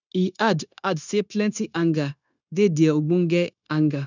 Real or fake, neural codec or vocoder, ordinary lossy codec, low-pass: fake; codec, 16 kHz, 0.9 kbps, LongCat-Audio-Codec; none; 7.2 kHz